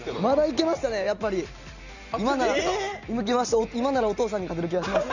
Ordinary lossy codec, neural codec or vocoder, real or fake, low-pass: none; none; real; 7.2 kHz